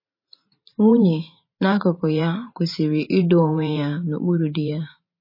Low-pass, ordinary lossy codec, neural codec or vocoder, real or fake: 5.4 kHz; MP3, 24 kbps; vocoder, 44.1 kHz, 128 mel bands every 512 samples, BigVGAN v2; fake